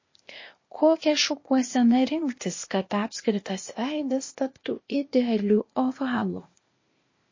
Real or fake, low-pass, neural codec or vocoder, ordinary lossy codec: fake; 7.2 kHz; codec, 16 kHz, 0.8 kbps, ZipCodec; MP3, 32 kbps